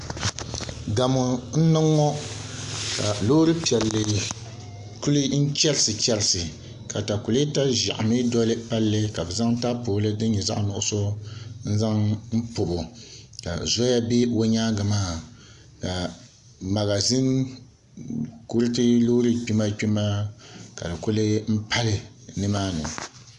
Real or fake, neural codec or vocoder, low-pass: real; none; 10.8 kHz